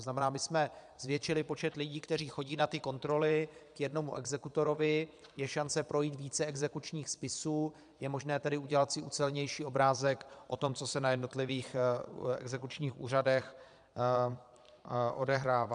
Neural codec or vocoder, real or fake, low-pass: vocoder, 22.05 kHz, 80 mel bands, Vocos; fake; 9.9 kHz